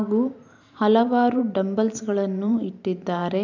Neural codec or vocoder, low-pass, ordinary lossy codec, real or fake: vocoder, 44.1 kHz, 128 mel bands every 512 samples, BigVGAN v2; 7.2 kHz; none; fake